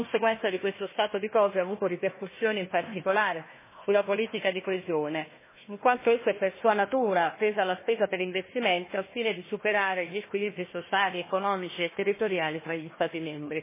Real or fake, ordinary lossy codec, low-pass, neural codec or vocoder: fake; MP3, 16 kbps; 3.6 kHz; codec, 16 kHz, 1 kbps, FunCodec, trained on Chinese and English, 50 frames a second